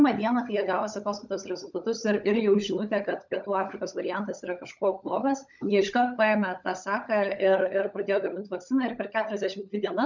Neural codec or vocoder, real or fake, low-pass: codec, 16 kHz, 16 kbps, FunCodec, trained on LibriTTS, 50 frames a second; fake; 7.2 kHz